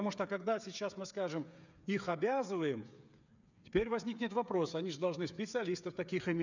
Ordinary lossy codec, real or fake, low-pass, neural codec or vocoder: none; fake; 7.2 kHz; codec, 16 kHz, 8 kbps, FreqCodec, smaller model